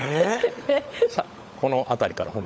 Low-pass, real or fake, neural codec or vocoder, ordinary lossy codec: none; fake; codec, 16 kHz, 16 kbps, FunCodec, trained on LibriTTS, 50 frames a second; none